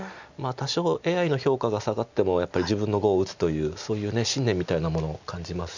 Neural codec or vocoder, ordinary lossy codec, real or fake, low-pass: none; none; real; 7.2 kHz